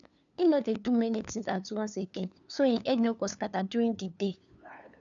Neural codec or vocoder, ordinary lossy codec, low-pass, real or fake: codec, 16 kHz, 2 kbps, FunCodec, trained on LibriTTS, 25 frames a second; none; 7.2 kHz; fake